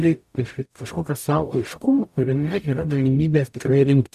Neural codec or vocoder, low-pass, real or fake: codec, 44.1 kHz, 0.9 kbps, DAC; 14.4 kHz; fake